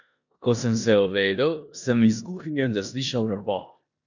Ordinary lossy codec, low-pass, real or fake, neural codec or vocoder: none; 7.2 kHz; fake; codec, 16 kHz in and 24 kHz out, 0.9 kbps, LongCat-Audio-Codec, four codebook decoder